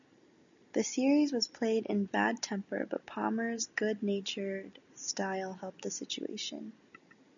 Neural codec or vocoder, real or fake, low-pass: none; real; 7.2 kHz